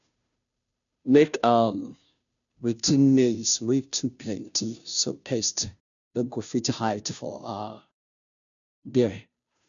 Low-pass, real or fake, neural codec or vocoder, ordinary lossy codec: 7.2 kHz; fake; codec, 16 kHz, 0.5 kbps, FunCodec, trained on Chinese and English, 25 frames a second; none